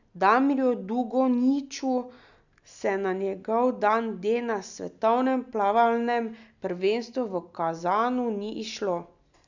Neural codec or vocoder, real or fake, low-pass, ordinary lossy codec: none; real; 7.2 kHz; none